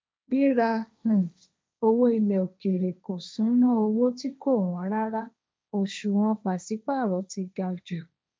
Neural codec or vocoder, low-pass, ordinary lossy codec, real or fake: codec, 16 kHz, 1.1 kbps, Voila-Tokenizer; none; none; fake